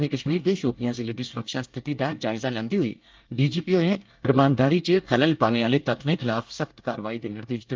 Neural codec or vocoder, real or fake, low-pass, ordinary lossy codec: codec, 24 kHz, 1 kbps, SNAC; fake; 7.2 kHz; Opus, 32 kbps